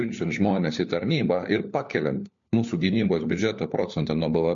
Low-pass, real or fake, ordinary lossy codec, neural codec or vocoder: 7.2 kHz; fake; MP3, 48 kbps; codec, 16 kHz, 4 kbps, FunCodec, trained on LibriTTS, 50 frames a second